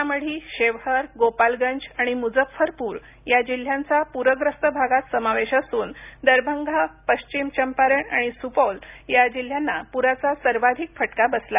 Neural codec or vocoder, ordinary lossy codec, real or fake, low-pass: none; none; real; 3.6 kHz